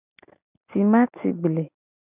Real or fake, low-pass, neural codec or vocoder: real; 3.6 kHz; none